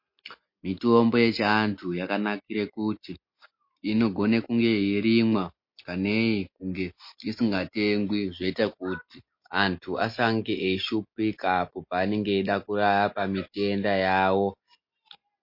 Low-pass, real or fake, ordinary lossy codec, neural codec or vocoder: 5.4 kHz; real; MP3, 32 kbps; none